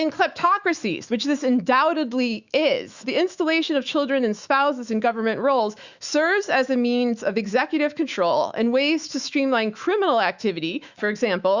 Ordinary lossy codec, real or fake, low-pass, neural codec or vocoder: Opus, 64 kbps; fake; 7.2 kHz; autoencoder, 48 kHz, 128 numbers a frame, DAC-VAE, trained on Japanese speech